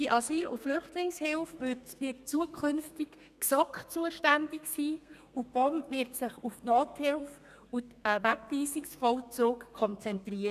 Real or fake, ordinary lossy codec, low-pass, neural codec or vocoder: fake; none; 14.4 kHz; codec, 32 kHz, 1.9 kbps, SNAC